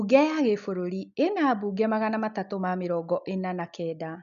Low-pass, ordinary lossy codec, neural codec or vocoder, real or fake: 7.2 kHz; none; none; real